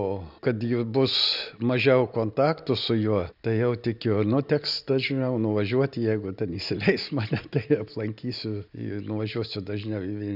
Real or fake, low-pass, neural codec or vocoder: real; 5.4 kHz; none